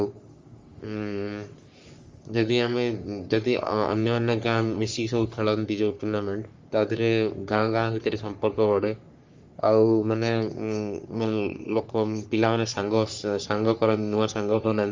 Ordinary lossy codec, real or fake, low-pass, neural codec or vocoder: Opus, 32 kbps; fake; 7.2 kHz; codec, 44.1 kHz, 3.4 kbps, Pupu-Codec